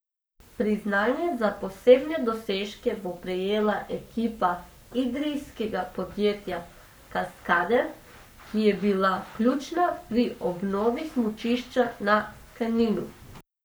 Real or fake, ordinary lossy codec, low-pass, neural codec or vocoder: fake; none; none; codec, 44.1 kHz, 7.8 kbps, Pupu-Codec